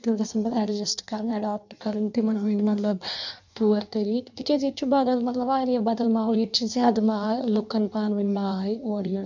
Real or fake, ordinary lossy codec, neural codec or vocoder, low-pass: fake; none; codec, 16 kHz in and 24 kHz out, 1.1 kbps, FireRedTTS-2 codec; 7.2 kHz